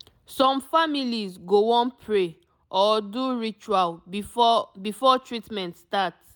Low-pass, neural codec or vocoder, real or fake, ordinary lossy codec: none; none; real; none